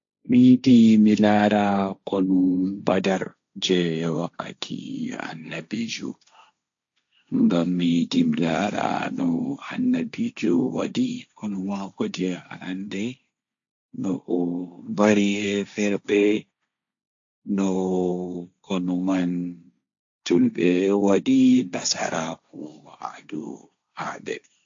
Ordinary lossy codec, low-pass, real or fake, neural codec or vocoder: AAC, 64 kbps; 7.2 kHz; fake; codec, 16 kHz, 1.1 kbps, Voila-Tokenizer